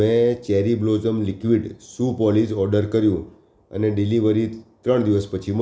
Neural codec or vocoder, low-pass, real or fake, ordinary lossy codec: none; none; real; none